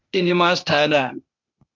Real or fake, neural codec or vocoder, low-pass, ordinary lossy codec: fake; codec, 16 kHz, 0.8 kbps, ZipCodec; 7.2 kHz; MP3, 64 kbps